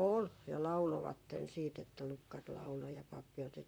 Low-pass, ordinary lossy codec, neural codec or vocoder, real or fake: none; none; vocoder, 44.1 kHz, 128 mel bands, Pupu-Vocoder; fake